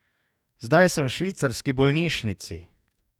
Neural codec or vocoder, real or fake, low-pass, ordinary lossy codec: codec, 44.1 kHz, 2.6 kbps, DAC; fake; 19.8 kHz; none